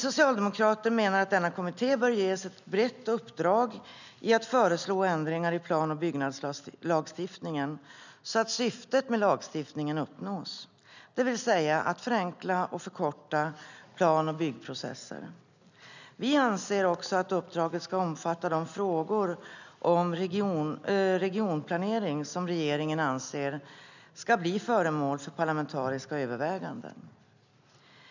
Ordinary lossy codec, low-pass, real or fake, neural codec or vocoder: none; 7.2 kHz; real; none